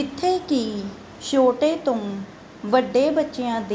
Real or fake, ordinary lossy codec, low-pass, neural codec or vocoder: real; none; none; none